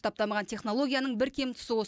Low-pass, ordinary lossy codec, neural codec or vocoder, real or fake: none; none; none; real